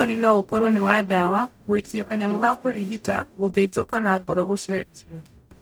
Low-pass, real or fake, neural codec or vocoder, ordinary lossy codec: none; fake; codec, 44.1 kHz, 0.9 kbps, DAC; none